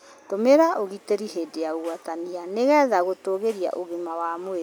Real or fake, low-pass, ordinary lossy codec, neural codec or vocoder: real; none; none; none